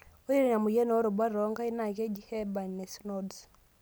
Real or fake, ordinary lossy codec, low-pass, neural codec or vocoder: real; none; none; none